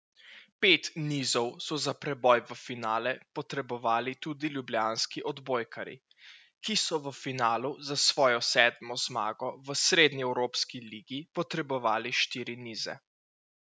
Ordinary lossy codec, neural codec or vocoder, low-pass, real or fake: none; none; none; real